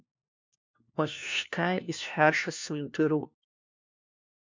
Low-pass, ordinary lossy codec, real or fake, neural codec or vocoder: 7.2 kHz; MP3, 64 kbps; fake; codec, 16 kHz, 1 kbps, FunCodec, trained on LibriTTS, 50 frames a second